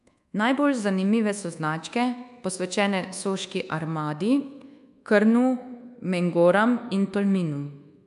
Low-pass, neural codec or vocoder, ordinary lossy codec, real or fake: 10.8 kHz; codec, 24 kHz, 1.2 kbps, DualCodec; MP3, 96 kbps; fake